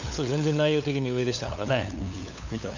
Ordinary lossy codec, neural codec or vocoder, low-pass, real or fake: none; codec, 16 kHz, 8 kbps, FunCodec, trained on LibriTTS, 25 frames a second; 7.2 kHz; fake